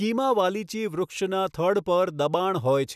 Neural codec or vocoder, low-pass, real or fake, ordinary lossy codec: none; 14.4 kHz; real; none